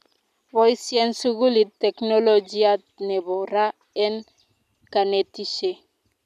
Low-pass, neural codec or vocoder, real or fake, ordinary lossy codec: 14.4 kHz; none; real; none